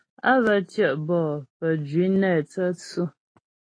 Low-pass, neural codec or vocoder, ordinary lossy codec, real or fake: 9.9 kHz; none; AAC, 32 kbps; real